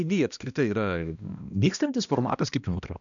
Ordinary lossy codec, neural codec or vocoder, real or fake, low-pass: MP3, 96 kbps; codec, 16 kHz, 1 kbps, X-Codec, HuBERT features, trained on balanced general audio; fake; 7.2 kHz